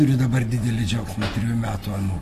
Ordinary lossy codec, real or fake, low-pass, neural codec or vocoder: AAC, 48 kbps; real; 14.4 kHz; none